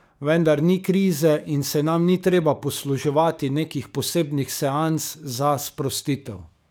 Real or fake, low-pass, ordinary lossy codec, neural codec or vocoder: fake; none; none; codec, 44.1 kHz, 7.8 kbps, DAC